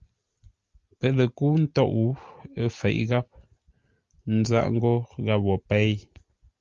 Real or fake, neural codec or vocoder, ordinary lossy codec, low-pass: real; none; Opus, 32 kbps; 7.2 kHz